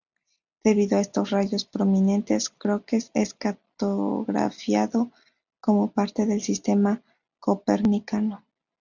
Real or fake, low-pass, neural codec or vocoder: real; 7.2 kHz; none